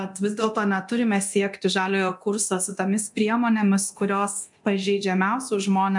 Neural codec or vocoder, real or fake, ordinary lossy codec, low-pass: codec, 24 kHz, 0.9 kbps, DualCodec; fake; MP3, 64 kbps; 10.8 kHz